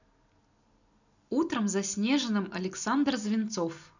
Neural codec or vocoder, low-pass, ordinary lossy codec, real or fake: none; 7.2 kHz; none; real